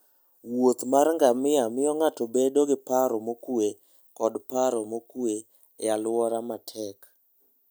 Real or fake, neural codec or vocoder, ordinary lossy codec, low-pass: real; none; none; none